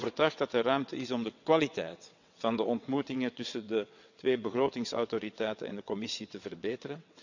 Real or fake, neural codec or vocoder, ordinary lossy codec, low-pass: fake; vocoder, 22.05 kHz, 80 mel bands, WaveNeXt; none; 7.2 kHz